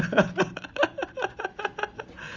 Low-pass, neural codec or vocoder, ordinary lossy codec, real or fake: 7.2 kHz; none; Opus, 32 kbps; real